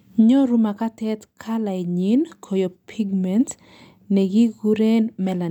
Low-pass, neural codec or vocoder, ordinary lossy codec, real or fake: 19.8 kHz; none; none; real